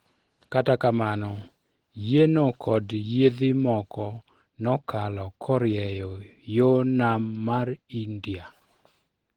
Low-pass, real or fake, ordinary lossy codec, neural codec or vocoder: 19.8 kHz; real; Opus, 16 kbps; none